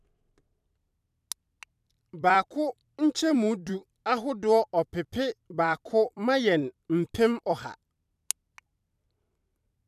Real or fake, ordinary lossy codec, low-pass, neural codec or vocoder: fake; none; 14.4 kHz; vocoder, 44.1 kHz, 128 mel bands every 256 samples, BigVGAN v2